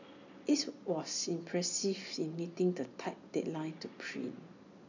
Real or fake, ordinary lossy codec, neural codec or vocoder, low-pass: real; none; none; 7.2 kHz